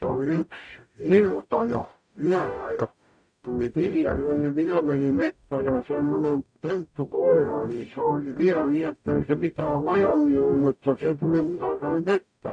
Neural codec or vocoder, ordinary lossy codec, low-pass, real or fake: codec, 44.1 kHz, 0.9 kbps, DAC; none; 9.9 kHz; fake